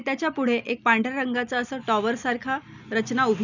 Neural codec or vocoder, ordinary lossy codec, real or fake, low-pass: none; none; real; 7.2 kHz